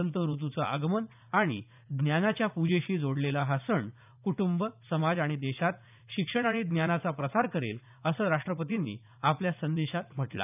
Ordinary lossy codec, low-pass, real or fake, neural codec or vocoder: none; 3.6 kHz; fake; vocoder, 44.1 kHz, 80 mel bands, Vocos